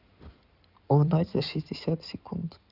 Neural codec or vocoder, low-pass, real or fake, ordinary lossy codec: codec, 16 kHz in and 24 kHz out, 2.2 kbps, FireRedTTS-2 codec; 5.4 kHz; fake; none